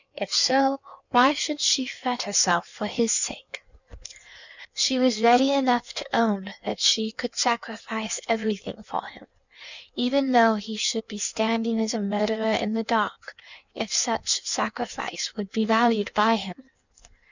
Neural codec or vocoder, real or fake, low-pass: codec, 16 kHz in and 24 kHz out, 1.1 kbps, FireRedTTS-2 codec; fake; 7.2 kHz